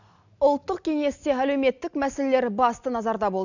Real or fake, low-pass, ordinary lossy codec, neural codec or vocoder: real; 7.2 kHz; none; none